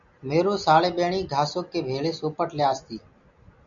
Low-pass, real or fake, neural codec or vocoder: 7.2 kHz; real; none